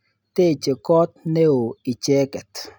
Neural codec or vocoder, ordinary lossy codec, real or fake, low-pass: none; none; real; none